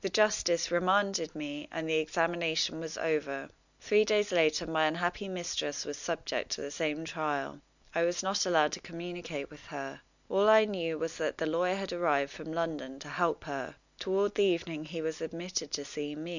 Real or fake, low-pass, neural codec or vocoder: real; 7.2 kHz; none